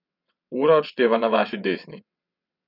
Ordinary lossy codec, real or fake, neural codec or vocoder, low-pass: none; fake; vocoder, 22.05 kHz, 80 mel bands, WaveNeXt; 5.4 kHz